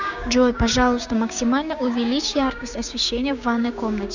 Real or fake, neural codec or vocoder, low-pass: fake; codec, 16 kHz, 6 kbps, DAC; 7.2 kHz